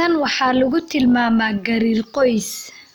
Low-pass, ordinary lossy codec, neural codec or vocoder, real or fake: none; none; none; real